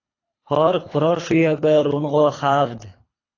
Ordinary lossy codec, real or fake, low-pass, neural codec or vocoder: AAC, 32 kbps; fake; 7.2 kHz; codec, 24 kHz, 3 kbps, HILCodec